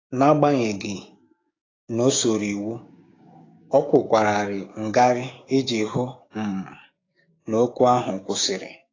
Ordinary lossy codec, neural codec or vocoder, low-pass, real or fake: AAC, 32 kbps; codec, 16 kHz, 6 kbps, DAC; 7.2 kHz; fake